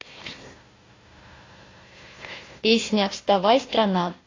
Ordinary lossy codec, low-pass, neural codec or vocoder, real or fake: AAC, 32 kbps; 7.2 kHz; codec, 16 kHz, 1 kbps, FunCodec, trained on Chinese and English, 50 frames a second; fake